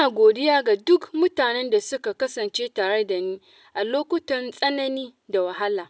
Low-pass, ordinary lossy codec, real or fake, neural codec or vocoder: none; none; real; none